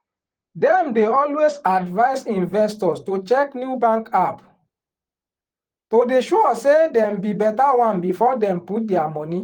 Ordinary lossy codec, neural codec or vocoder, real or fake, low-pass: Opus, 24 kbps; vocoder, 44.1 kHz, 128 mel bands, Pupu-Vocoder; fake; 19.8 kHz